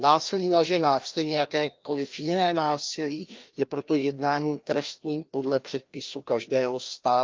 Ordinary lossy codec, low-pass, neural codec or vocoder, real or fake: Opus, 24 kbps; 7.2 kHz; codec, 16 kHz, 1 kbps, FreqCodec, larger model; fake